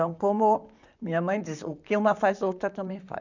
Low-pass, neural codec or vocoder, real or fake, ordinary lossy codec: 7.2 kHz; vocoder, 44.1 kHz, 128 mel bands, Pupu-Vocoder; fake; none